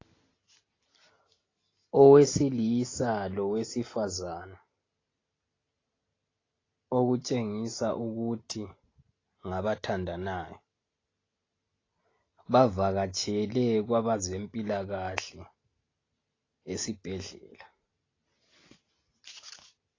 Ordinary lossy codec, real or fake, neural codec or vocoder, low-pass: AAC, 32 kbps; real; none; 7.2 kHz